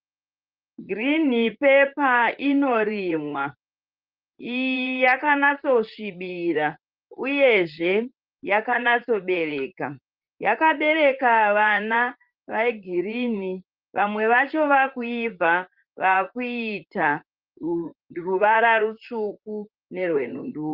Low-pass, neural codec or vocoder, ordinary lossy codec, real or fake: 5.4 kHz; vocoder, 44.1 kHz, 128 mel bands, Pupu-Vocoder; Opus, 24 kbps; fake